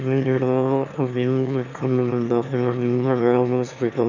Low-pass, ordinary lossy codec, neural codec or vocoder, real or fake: 7.2 kHz; none; autoencoder, 22.05 kHz, a latent of 192 numbers a frame, VITS, trained on one speaker; fake